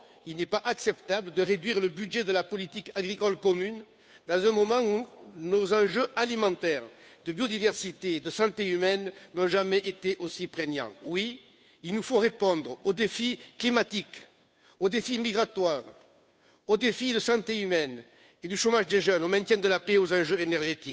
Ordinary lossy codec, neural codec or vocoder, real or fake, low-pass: none; codec, 16 kHz, 2 kbps, FunCodec, trained on Chinese and English, 25 frames a second; fake; none